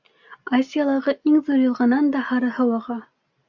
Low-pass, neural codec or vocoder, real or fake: 7.2 kHz; none; real